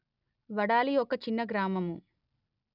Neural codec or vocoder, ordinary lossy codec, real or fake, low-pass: none; none; real; 5.4 kHz